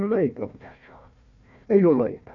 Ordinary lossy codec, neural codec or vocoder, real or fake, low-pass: none; codec, 16 kHz, 1 kbps, FunCodec, trained on Chinese and English, 50 frames a second; fake; 7.2 kHz